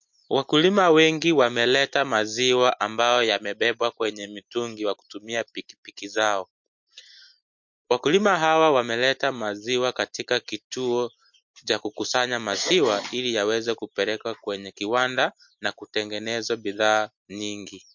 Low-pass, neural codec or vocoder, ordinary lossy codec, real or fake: 7.2 kHz; none; MP3, 48 kbps; real